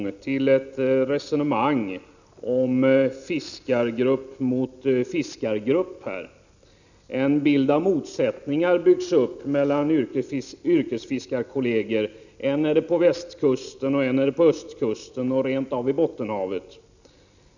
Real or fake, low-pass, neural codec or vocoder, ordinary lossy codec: real; 7.2 kHz; none; none